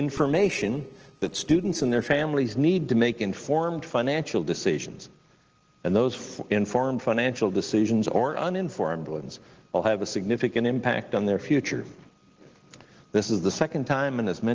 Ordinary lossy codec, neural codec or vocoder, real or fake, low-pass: Opus, 16 kbps; none; real; 7.2 kHz